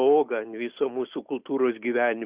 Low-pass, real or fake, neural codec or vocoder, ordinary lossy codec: 3.6 kHz; real; none; Opus, 64 kbps